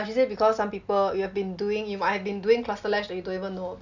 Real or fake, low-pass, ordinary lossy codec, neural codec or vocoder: real; 7.2 kHz; none; none